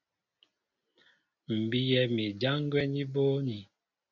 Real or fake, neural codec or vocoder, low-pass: real; none; 7.2 kHz